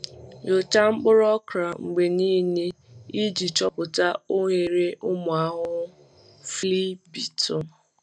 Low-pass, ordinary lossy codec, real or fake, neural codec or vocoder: 9.9 kHz; none; real; none